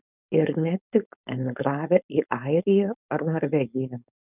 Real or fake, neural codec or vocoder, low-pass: fake; codec, 16 kHz, 4.8 kbps, FACodec; 3.6 kHz